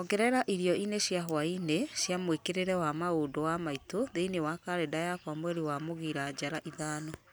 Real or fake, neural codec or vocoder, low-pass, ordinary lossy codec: real; none; none; none